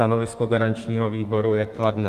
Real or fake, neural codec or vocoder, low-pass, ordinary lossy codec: fake; codec, 32 kHz, 1.9 kbps, SNAC; 14.4 kHz; Opus, 24 kbps